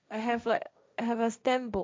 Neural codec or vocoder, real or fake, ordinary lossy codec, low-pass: codec, 16 kHz, 1.1 kbps, Voila-Tokenizer; fake; none; none